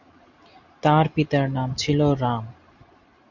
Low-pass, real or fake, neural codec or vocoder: 7.2 kHz; real; none